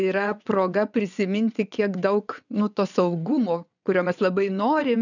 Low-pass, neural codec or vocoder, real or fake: 7.2 kHz; vocoder, 22.05 kHz, 80 mel bands, WaveNeXt; fake